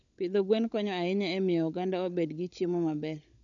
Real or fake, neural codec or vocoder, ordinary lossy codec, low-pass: fake; codec, 16 kHz, 16 kbps, FunCodec, trained on LibriTTS, 50 frames a second; none; 7.2 kHz